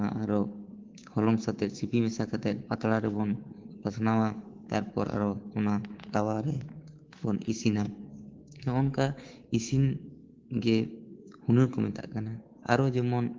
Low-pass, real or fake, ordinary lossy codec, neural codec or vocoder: 7.2 kHz; fake; Opus, 16 kbps; codec, 24 kHz, 3.1 kbps, DualCodec